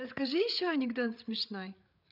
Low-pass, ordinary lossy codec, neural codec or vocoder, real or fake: 5.4 kHz; none; codec, 16 kHz, 8 kbps, FreqCodec, larger model; fake